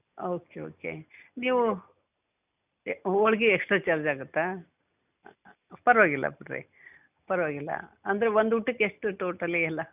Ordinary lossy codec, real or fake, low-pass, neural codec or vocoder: none; real; 3.6 kHz; none